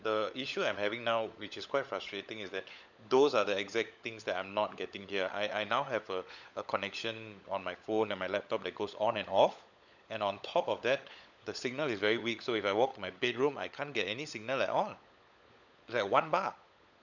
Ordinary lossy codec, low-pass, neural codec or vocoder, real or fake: none; 7.2 kHz; codec, 16 kHz, 16 kbps, FunCodec, trained on LibriTTS, 50 frames a second; fake